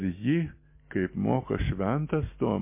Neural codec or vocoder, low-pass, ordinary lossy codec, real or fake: none; 3.6 kHz; MP3, 24 kbps; real